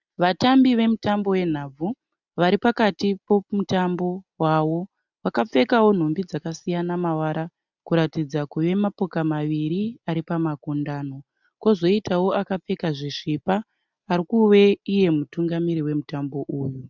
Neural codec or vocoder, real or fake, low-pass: none; real; 7.2 kHz